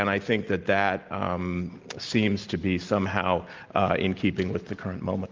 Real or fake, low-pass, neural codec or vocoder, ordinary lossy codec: real; 7.2 kHz; none; Opus, 32 kbps